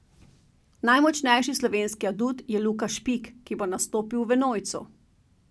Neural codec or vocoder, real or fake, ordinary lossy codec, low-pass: none; real; none; none